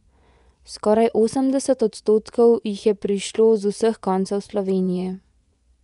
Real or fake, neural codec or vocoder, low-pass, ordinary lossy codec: fake; vocoder, 24 kHz, 100 mel bands, Vocos; 10.8 kHz; none